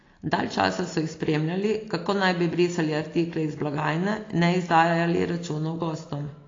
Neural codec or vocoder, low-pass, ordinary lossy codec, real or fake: none; 7.2 kHz; AAC, 32 kbps; real